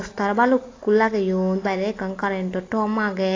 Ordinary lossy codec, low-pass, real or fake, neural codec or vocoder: AAC, 32 kbps; 7.2 kHz; real; none